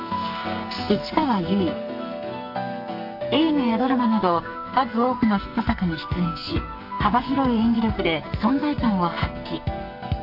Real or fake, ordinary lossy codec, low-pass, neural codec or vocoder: fake; none; 5.4 kHz; codec, 44.1 kHz, 2.6 kbps, SNAC